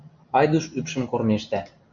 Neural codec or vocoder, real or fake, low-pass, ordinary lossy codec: none; real; 7.2 kHz; MP3, 96 kbps